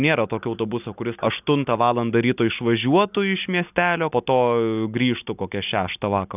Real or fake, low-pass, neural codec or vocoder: real; 3.6 kHz; none